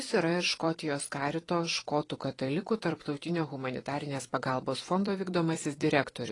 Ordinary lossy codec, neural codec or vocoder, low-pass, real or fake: AAC, 32 kbps; vocoder, 44.1 kHz, 128 mel bands every 256 samples, BigVGAN v2; 10.8 kHz; fake